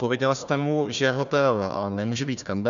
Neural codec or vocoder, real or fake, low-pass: codec, 16 kHz, 1 kbps, FunCodec, trained on Chinese and English, 50 frames a second; fake; 7.2 kHz